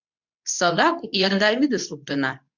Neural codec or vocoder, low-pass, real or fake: codec, 24 kHz, 0.9 kbps, WavTokenizer, medium speech release version 2; 7.2 kHz; fake